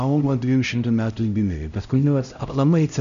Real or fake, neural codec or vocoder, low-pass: fake; codec, 16 kHz, 0.5 kbps, X-Codec, HuBERT features, trained on LibriSpeech; 7.2 kHz